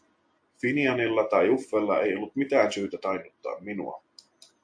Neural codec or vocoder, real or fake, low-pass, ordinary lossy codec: none; real; 9.9 kHz; AAC, 64 kbps